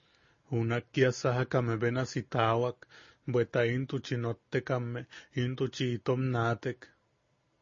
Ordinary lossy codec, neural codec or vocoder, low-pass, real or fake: MP3, 32 kbps; none; 7.2 kHz; real